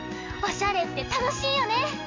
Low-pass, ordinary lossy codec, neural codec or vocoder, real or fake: 7.2 kHz; MP3, 48 kbps; none; real